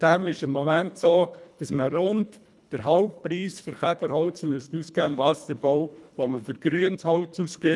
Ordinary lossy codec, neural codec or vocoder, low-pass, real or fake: none; codec, 24 kHz, 1.5 kbps, HILCodec; none; fake